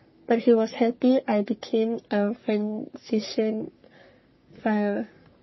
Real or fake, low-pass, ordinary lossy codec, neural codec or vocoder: fake; 7.2 kHz; MP3, 24 kbps; codec, 44.1 kHz, 3.4 kbps, Pupu-Codec